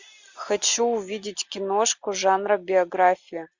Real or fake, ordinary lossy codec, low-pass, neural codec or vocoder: real; Opus, 64 kbps; 7.2 kHz; none